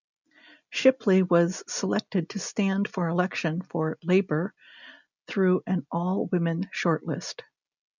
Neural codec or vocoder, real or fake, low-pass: none; real; 7.2 kHz